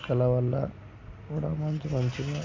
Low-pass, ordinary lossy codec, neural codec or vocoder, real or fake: 7.2 kHz; AAC, 32 kbps; none; real